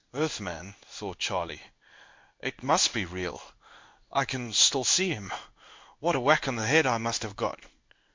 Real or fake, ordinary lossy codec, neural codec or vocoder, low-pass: fake; MP3, 48 kbps; codec, 16 kHz in and 24 kHz out, 1 kbps, XY-Tokenizer; 7.2 kHz